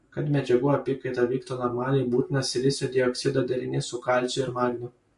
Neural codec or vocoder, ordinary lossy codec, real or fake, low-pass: none; MP3, 48 kbps; real; 10.8 kHz